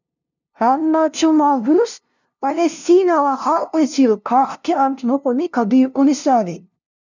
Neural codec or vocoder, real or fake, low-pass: codec, 16 kHz, 0.5 kbps, FunCodec, trained on LibriTTS, 25 frames a second; fake; 7.2 kHz